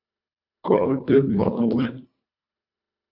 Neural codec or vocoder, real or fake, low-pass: codec, 24 kHz, 1.5 kbps, HILCodec; fake; 5.4 kHz